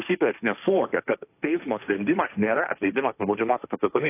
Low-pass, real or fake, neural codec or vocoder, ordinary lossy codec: 3.6 kHz; fake; codec, 16 kHz, 1.1 kbps, Voila-Tokenizer; AAC, 24 kbps